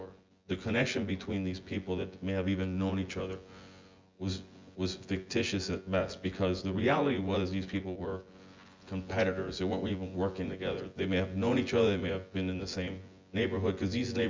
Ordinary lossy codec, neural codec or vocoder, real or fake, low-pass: Opus, 32 kbps; vocoder, 24 kHz, 100 mel bands, Vocos; fake; 7.2 kHz